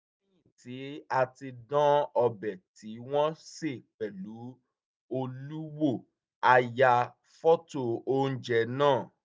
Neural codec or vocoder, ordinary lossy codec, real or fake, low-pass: none; none; real; none